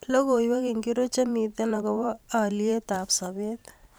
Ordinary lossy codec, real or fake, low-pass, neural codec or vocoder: none; fake; none; vocoder, 44.1 kHz, 128 mel bands every 512 samples, BigVGAN v2